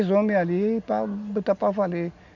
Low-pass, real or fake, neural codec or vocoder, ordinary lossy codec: 7.2 kHz; real; none; none